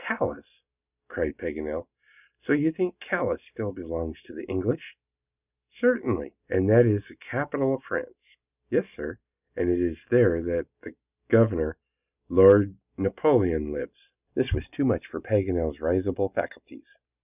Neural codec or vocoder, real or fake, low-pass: none; real; 3.6 kHz